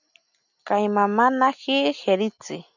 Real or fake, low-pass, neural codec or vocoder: real; 7.2 kHz; none